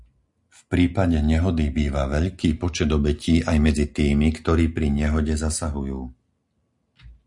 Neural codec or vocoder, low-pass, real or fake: none; 10.8 kHz; real